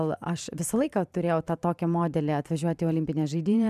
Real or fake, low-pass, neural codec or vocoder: fake; 14.4 kHz; vocoder, 44.1 kHz, 128 mel bands every 512 samples, BigVGAN v2